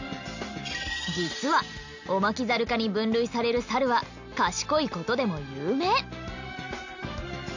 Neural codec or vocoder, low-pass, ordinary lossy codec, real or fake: none; 7.2 kHz; none; real